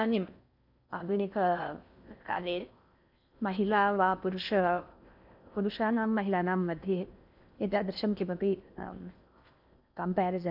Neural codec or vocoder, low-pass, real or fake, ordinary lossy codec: codec, 16 kHz in and 24 kHz out, 0.6 kbps, FocalCodec, streaming, 4096 codes; 5.4 kHz; fake; none